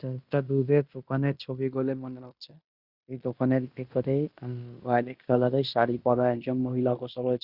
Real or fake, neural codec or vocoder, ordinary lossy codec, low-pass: fake; codec, 16 kHz in and 24 kHz out, 0.9 kbps, LongCat-Audio-Codec, fine tuned four codebook decoder; Opus, 64 kbps; 5.4 kHz